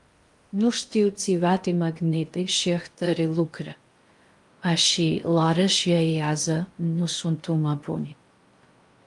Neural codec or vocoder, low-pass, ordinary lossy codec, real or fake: codec, 16 kHz in and 24 kHz out, 0.6 kbps, FocalCodec, streaming, 2048 codes; 10.8 kHz; Opus, 32 kbps; fake